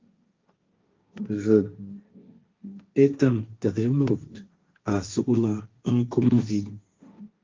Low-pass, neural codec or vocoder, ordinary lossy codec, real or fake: 7.2 kHz; codec, 16 kHz, 1.1 kbps, Voila-Tokenizer; Opus, 24 kbps; fake